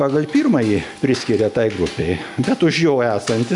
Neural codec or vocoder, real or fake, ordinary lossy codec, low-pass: none; real; MP3, 96 kbps; 10.8 kHz